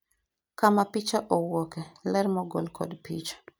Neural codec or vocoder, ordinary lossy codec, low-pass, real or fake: none; none; none; real